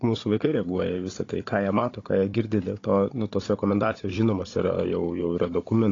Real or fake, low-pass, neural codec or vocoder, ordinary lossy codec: fake; 7.2 kHz; codec, 16 kHz, 16 kbps, FunCodec, trained on Chinese and English, 50 frames a second; AAC, 32 kbps